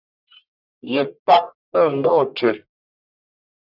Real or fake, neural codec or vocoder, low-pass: fake; codec, 44.1 kHz, 1.7 kbps, Pupu-Codec; 5.4 kHz